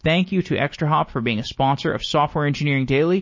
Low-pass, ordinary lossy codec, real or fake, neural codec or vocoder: 7.2 kHz; MP3, 32 kbps; real; none